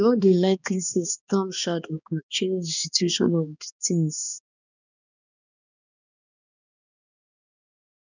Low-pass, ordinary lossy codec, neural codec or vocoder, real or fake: 7.2 kHz; none; codec, 16 kHz, 2 kbps, X-Codec, HuBERT features, trained on balanced general audio; fake